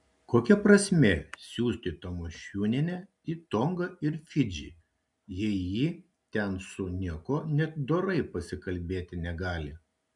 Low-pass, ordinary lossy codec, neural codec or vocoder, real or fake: 10.8 kHz; MP3, 96 kbps; none; real